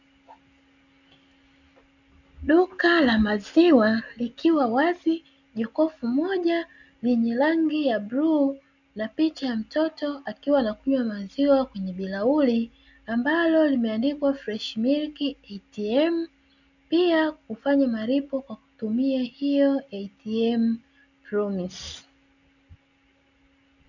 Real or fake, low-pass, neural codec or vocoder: real; 7.2 kHz; none